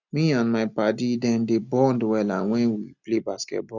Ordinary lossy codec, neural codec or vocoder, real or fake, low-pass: none; none; real; 7.2 kHz